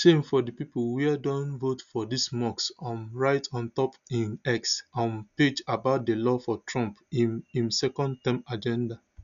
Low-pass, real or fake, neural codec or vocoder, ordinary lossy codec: 7.2 kHz; real; none; none